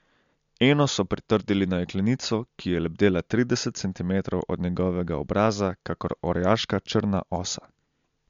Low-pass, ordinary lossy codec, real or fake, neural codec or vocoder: 7.2 kHz; MP3, 64 kbps; real; none